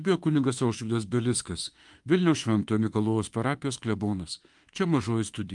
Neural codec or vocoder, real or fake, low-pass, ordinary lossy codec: autoencoder, 48 kHz, 32 numbers a frame, DAC-VAE, trained on Japanese speech; fake; 10.8 kHz; Opus, 32 kbps